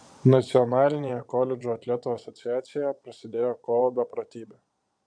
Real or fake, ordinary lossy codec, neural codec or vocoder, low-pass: fake; MP3, 64 kbps; vocoder, 22.05 kHz, 80 mel bands, Vocos; 9.9 kHz